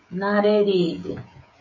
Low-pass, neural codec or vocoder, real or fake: 7.2 kHz; codec, 16 kHz, 16 kbps, FreqCodec, smaller model; fake